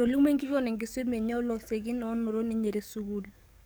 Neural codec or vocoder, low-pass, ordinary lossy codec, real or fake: codec, 44.1 kHz, 7.8 kbps, DAC; none; none; fake